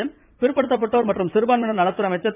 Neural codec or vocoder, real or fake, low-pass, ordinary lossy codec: vocoder, 44.1 kHz, 80 mel bands, Vocos; fake; 3.6 kHz; none